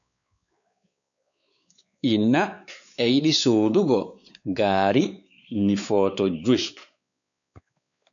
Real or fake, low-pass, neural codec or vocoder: fake; 7.2 kHz; codec, 16 kHz, 4 kbps, X-Codec, WavLM features, trained on Multilingual LibriSpeech